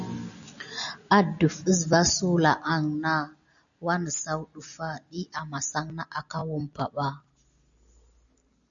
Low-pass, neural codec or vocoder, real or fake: 7.2 kHz; none; real